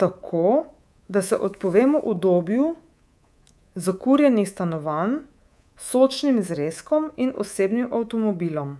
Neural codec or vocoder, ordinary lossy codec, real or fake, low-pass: codec, 24 kHz, 3.1 kbps, DualCodec; none; fake; none